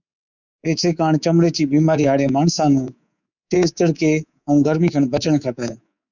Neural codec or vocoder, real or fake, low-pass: codec, 24 kHz, 3.1 kbps, DualCodec; fake; 7.2 kHz